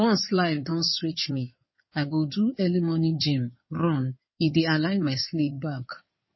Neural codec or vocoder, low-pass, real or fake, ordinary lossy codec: codec, 16 kHz in and 24 kHz out, 2.2 kbps, FireRedTTS-2 codec; 7.2 kHz; fake; MP3, 24 kbps